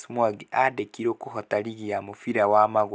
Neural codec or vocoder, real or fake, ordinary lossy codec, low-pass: none; real; none; none